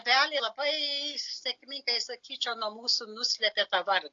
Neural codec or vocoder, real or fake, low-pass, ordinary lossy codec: none; real; 7.2 kHz; AAC, 48 kbps